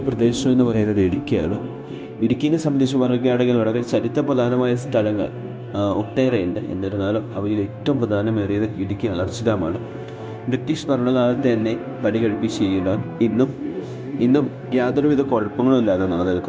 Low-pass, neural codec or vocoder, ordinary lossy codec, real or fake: none; codec, 16 kHz, 0.9 kbps, LongCat-Audio-Codec; none; fake